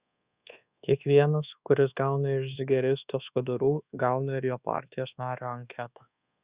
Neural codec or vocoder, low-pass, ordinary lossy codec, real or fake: codec, 24 kHz, 1.2 kbps, DualCodec; 3.6 kHz; Opus, 64 kbps; fake